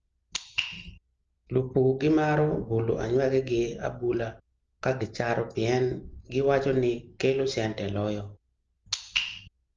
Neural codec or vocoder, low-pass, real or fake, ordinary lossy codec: none; 7.2 kHz; real; Opus, 24 kbps